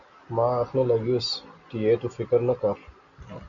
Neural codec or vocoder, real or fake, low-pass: none; real; 7.2 kHz